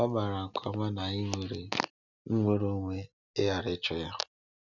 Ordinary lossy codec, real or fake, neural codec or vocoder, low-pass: none; real; none; 7.2 kHz